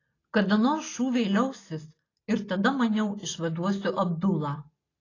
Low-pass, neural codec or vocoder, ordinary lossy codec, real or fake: 7.2 kHz; none; AAC, 32 kbps; real